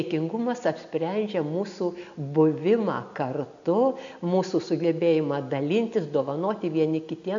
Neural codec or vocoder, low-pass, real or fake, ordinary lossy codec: none; 7.2 kHz; real; AAC, 64 kbps